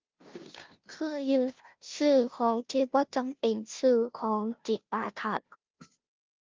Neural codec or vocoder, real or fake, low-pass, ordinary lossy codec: codec, 16 kHz, 0.5 kbps, FunCodec, trained on Chinese and English, 25 frames a second; fake; 7.2 kHz; Opus, 32 kbps